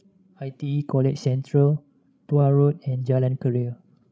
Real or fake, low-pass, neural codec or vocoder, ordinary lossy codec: fake; none; codec, 16 kHz, 16 kbps, FreqCodec, larger model; none